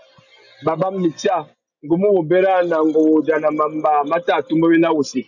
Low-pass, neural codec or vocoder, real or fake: 7.2 kHz; none; real